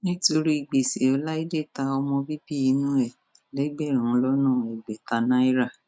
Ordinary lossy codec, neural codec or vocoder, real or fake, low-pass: none; none; real; none